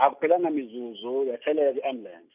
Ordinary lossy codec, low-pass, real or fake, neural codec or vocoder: none; 3.6 kHz; real; none